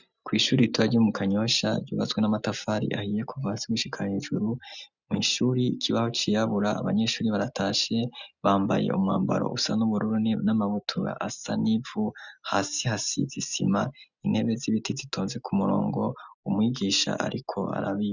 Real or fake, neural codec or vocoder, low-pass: real; none; 7.2 kHz